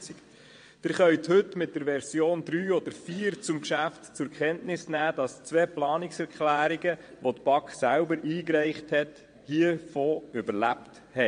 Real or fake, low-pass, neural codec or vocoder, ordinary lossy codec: fake; 9.9 kHz; vocoder, 22.05 kHz, 80 mel bands, WaveNeXt; MP3, 48 kbps